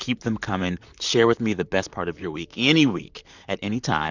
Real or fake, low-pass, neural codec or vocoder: fake; 7.2 kHz; vocoder, 44.1 kHz, 128 mel bands, Pupu-Vocoder